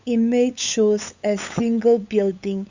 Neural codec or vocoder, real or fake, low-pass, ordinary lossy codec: codec, 16 kHz, 8 kbps, FunCodec, trained on LibriTTS, 25 frames a second; fake; 7.2 kHz; Opus, 64 kbps